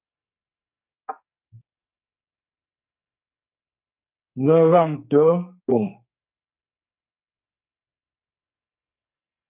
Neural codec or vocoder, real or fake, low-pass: codec, 44.1 kHz, 2.6 kbps, SNAC; fake; 3.6 kHz